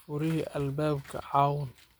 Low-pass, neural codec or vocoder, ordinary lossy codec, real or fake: none; none; none; real